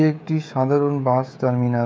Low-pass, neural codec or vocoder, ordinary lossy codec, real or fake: none; codec, 16 kHz, 16 kbps, FreqCodec, smaller model; none; fake